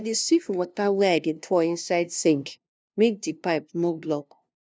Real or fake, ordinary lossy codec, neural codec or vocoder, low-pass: fake; none; codec, 16 kHz, 0.5 kbps, FunCodec, trained on LibriTTS, 25 frames a second; none